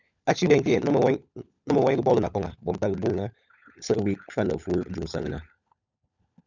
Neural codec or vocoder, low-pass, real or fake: codec, 16 kHz, 16 kbps, FunCodec, trained on LibriTTS, 50 frames a second; 7.2 kHz; fake